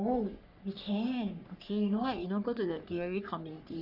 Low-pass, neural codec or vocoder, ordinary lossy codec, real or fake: 5.4 kHz; codec, 44.1 kHz, 3.4 kbps, Pupu-Codec; none; fake